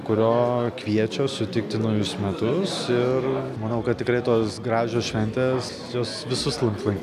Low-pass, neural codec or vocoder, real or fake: 14.4 kHz; none; real